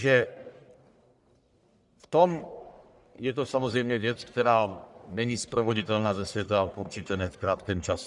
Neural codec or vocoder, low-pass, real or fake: codec, 44.1 kHz, 1.7 kbps, Pupu-Codec; 10.8 kHz; fake